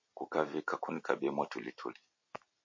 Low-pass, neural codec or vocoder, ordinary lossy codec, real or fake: 7.2 kHz; none; MP3, 32 kbps; real